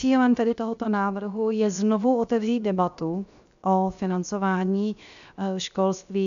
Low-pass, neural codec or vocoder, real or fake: 7.2 kHz; codec, 16 kHz, 0.7 kbps, FocalCodec; fake